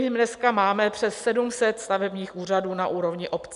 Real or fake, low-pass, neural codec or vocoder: real; 10.8 kHz; none